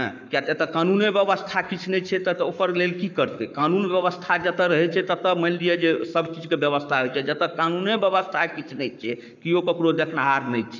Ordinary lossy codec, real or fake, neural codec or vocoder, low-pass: none; fake; codec, 44.1 kHz, 7.8 kbps, Pupu-Codec; 7.2 kHz